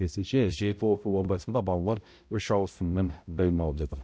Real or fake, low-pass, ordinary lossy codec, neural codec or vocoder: fake; none; none; codec, 16 kHz, 0.5 kbps, X-Codec, HuBERT features, trained on balanced general audio